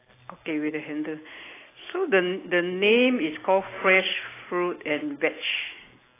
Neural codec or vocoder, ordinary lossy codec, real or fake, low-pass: none; AAC, 16 kbps; real; 3.6 kHz